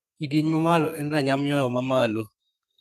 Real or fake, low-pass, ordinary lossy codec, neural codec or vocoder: fake; 14.4 kHz; none; codec, 32 kHz, 1.9 kbps, SNAC